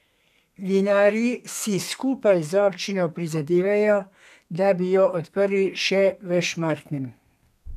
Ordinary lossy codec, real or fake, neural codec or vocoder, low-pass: none; fake; codec, 32 kHz, 1.9 kbps, SNAC; 14.4 kHz